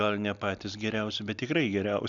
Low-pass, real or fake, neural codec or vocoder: 7.2 kHz; real; none